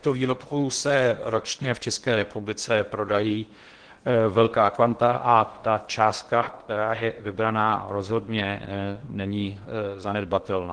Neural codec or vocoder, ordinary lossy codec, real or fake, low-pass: codec, 16 kHz in and 24 kHz out, 0.8 kbps, FocalCodec, streaming, 65536 codes; Opus, 16 kbps; fake; 9.9 kHz